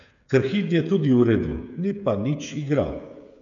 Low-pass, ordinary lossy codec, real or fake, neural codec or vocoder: 7.2 kHz; none; fake; codec, 16 kHz, 8 kbps, FreqCodec, smaller model